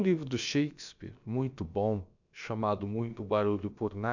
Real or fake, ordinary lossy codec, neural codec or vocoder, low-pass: fake; none; codec, 16 kHz, about 1 kbps, DyCAST, with the encoder's durations; 7.2 kHz